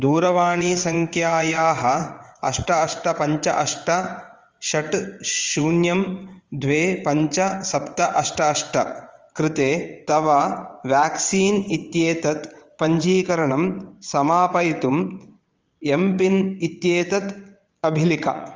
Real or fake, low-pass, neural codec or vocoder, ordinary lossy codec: fake; 7.2 kHz; vocoder, 22.05 kHz, 80 mel bands, WaveNeXt; Opus, 32 kbps